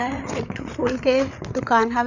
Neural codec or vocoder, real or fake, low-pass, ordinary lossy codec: codec, 16 kHz, 8 kbps, FreqCodec, larger model; fake; 7.2 kHz; none